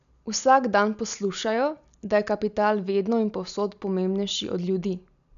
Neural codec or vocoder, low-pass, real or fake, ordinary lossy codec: none; 7.2 kHz; real; none